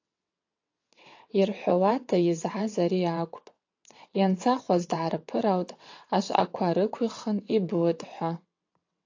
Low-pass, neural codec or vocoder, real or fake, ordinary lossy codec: 7.2 kHz; vocoder, 22.05 kHz, 80 mel bands, WaveNeXt; fake; AAC, 48 kbps